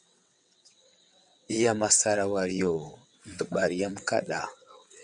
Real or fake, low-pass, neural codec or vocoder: fake; 9.9 kHz; vocoder, 22.05 kHz, 80 mel bands, WaveNeXt